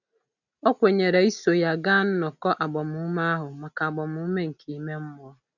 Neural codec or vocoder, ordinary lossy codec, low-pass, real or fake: none; none; 7.2 kHz; real